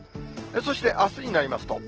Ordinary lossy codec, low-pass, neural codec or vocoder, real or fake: Opus, 16 kbps; 7.2 kHz; none; real